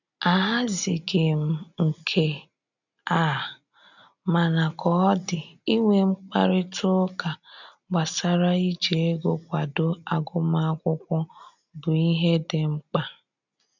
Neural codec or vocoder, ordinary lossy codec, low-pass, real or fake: none; none; 7.2 kHz; real